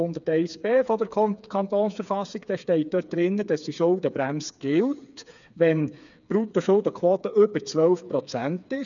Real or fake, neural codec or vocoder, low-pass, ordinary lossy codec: fake; codec, 16 kHz, 4 kbps, FreqCodec, smaller model; 7.2 kHz; none